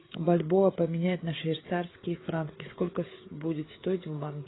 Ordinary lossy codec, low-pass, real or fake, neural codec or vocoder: AAC, 16 kbps; 7.2 kHz; fake; codec, 16 kHz, 16 kbps, FreqCodec, smaller model